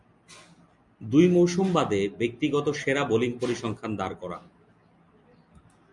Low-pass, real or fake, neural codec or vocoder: 10.8 kHz; real; none